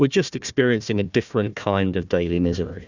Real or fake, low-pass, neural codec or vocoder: fake; 7.2 kHz; codec, 16 kHz, 1 kbps, FunCodec, trained on Chinese and English, 50 frames a second